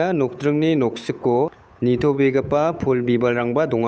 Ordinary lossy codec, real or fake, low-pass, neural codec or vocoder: none; fake; none; codec, 16 kHz, 8 kbps, FunCodec, trained on Chinese and English, 25 frames a second